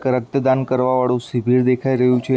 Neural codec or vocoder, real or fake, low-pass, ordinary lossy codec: none; real; none; none